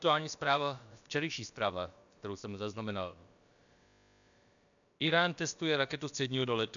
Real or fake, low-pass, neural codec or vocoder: fake; 7.2 kHz; codec, 16 kHz, about 1 kbps, DyCAST, with the encoder's durations